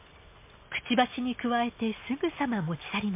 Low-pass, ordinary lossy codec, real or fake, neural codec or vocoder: 3.6 kHz; MP3, 24 kbps; real; none